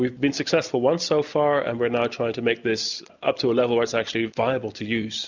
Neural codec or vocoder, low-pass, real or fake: none; 7.2 kHz; real